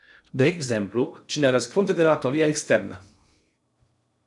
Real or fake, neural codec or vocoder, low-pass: fake; codec, 16 kHz in and 24 kHz out, 0.6 kbps, FocalCodec, streaming, 2048 codes; 10.8 kHz